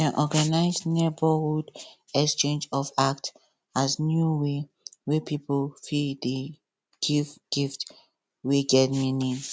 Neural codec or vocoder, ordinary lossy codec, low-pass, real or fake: none; none; none; real